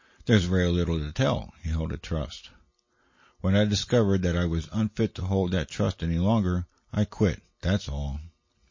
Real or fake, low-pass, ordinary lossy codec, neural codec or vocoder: real; 7.2 kHz; MP3, 32 kbps; none